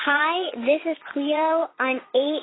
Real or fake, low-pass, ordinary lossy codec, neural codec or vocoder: fake; 7.2 kHz; AAC, 16 kbps; vocoder, 22.05 kHz, 80 mel bands, Vocos